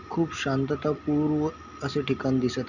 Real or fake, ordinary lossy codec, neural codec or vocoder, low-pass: real; none; none; 7.2 kHz